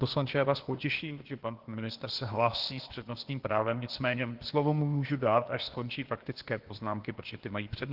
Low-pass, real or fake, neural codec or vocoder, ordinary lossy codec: 5.4 kHz; fake; codec, 16 kHz, 0.8 kbps, ZipCodec; Opus, 16 kbps